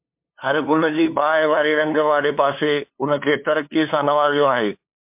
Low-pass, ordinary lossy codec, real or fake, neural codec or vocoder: 3.6 kHz; MP3, 32 kbps; fake; codec, 16 kHz, 2 kbps, FunCodec, trained on LibriTTS, 25 frames a second